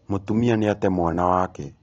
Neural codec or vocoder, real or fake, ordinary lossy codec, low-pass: none; real; AAC, 32 kbps; 7.2 kHz